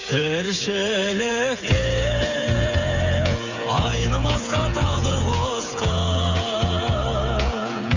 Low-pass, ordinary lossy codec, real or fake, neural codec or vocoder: 7.2 kHz; none; fake; codec, 16 kHz, 8 kbps, FreqCodec, smaller model